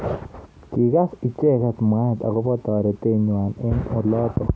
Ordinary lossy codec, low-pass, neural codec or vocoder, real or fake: none; none; none; real